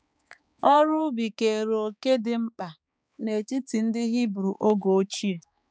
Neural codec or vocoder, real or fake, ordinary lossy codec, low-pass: codec, 16 kHz, 4 kbps, X-Codec, HuBERT features, trained on balanced general audio; fake; none; none